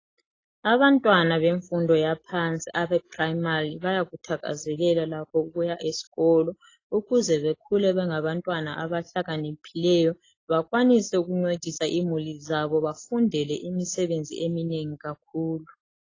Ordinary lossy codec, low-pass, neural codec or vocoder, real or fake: AAC, 32 kbps; 7.2 kHz; none; real